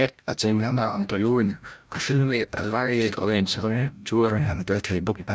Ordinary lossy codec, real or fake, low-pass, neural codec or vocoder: none; fake; none; codec, 16 kHz, 0.5 kbps, FreqCodec, larger model